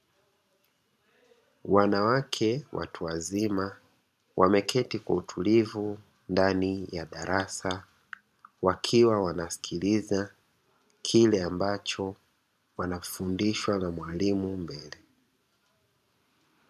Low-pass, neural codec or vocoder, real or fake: 14.4 kHz; vocoder, 44.1 kHz, 128 mel bands every 512 samples, BigVGAN v2; fake